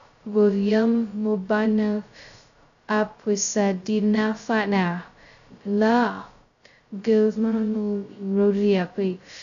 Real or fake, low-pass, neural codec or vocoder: fake; 7.2 kHz; codec, 16 kHz, 0.2 kbps, FocalCodec